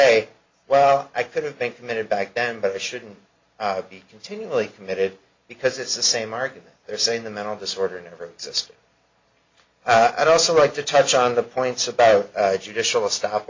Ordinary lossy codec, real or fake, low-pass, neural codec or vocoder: MP3, 48 kbps; real; 7.2 kHz; none